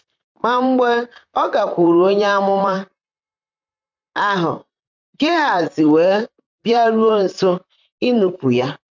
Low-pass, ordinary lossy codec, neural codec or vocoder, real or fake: 7.2 kHz; MP3, 64 kbps; vocoder, 44.1 kHz, 128 mel bands, Pupu-Vocoder; fake